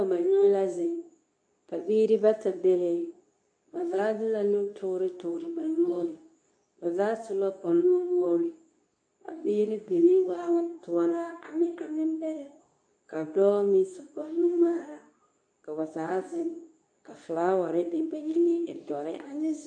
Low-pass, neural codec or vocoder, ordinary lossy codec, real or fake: 9.9 kHz; codec, 24 kHz, 0.9 kbps, WavTokenizer, medium speech release version 2; AAC, 64 kbps; fake